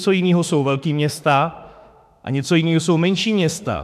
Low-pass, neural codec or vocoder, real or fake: 14.4 kHz; autoencoder, 48 kHz, 32 numbers a frame, DAC-VAE, trained on Japanese speech; fake